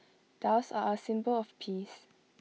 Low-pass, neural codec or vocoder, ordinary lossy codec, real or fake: none; none; none; real